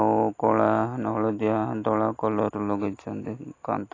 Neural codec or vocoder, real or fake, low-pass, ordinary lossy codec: none; real; 7.2 kHz; AAC, 32 kbps